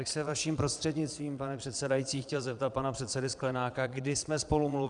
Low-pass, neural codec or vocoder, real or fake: 9.9 kHz; vocoder, 22.05 kHz, 80 mel bands, Vocos; fake